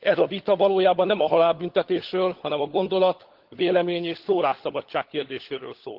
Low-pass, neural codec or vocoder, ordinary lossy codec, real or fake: 5.4 kHz; codec, 16 kHz, 16 kbps, FunCodec, trained on LibriTTS, 50 frames a second; Opus, 16 kbps; fake